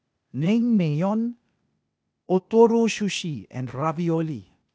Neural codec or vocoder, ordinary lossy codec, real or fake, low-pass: codec, 16 kHz, 0.8 kbps, ZipCodec; none; fake; none